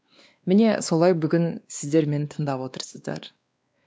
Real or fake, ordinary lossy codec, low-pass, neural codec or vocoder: fake; none; none; codec, 16 kHz, 2 kbps, X-Codec, WavLM features, trained on Multilingual LibriSpeech